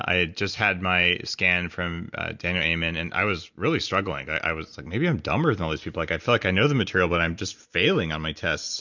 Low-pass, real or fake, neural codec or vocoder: 7.2 kHz; real; none